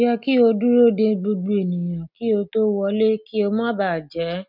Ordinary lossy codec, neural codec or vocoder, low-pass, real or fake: none; none; 5.4 kHz; real